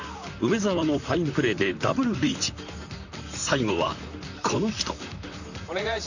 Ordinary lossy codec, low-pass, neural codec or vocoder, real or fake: none; 7.2 kHz; vocoder, 44.1 kHz, 128 mel bands, Pupu-Vocoder; fake